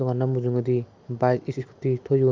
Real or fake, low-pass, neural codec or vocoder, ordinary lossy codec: real; 7.2 kHz; none; Opus, 24 kbps